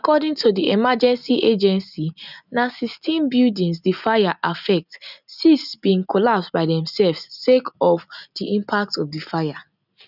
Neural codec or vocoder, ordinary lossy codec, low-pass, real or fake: none; none; 5.4 kHz; real